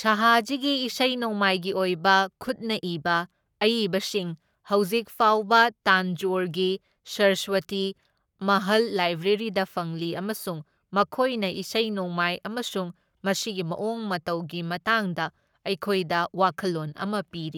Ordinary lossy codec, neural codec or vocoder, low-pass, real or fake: none; codec, 44.1 kHz, 7.8 kbps, Pupu-Codec; 19.8 kHz; fake